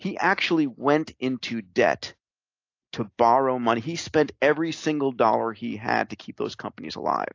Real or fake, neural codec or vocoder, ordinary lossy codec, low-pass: real; none; AAC, 48 kbps; 7.2 kHz